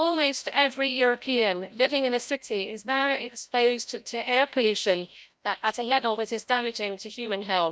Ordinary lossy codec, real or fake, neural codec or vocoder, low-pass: none; fake; codec, 16 kHz, 0.5 kbps, FreqCodec, larger model; none